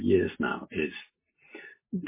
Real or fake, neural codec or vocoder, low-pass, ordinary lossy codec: real; none; 3.6 kHz; MP3, 16 kbps